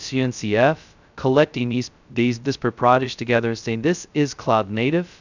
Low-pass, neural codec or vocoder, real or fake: 7.2 kHz; codec, 16 kHz, 0.2 kbps, FocalCodec; fake